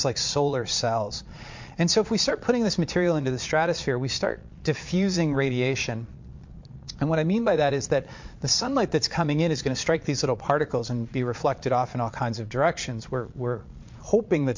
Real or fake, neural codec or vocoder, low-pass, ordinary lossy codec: fake; vocoder, 22.05 kHz, 80 mel bands, Vocos; 7.2 kHz; MP3, 48 kbps